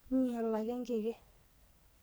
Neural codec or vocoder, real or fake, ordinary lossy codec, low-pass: codec, 44.1 kHz, 2.6 kbps, SNAC; fake; none; none